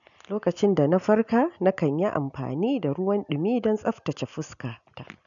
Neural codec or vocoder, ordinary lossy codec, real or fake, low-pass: none; none; real; 7.2 kHz